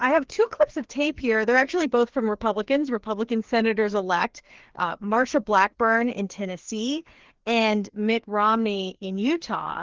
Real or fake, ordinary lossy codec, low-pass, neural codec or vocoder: fake; Opus, 16 kbps; 7.2 kHz; codec, 16 kHz, 2 kbps, FreqCodec, larger model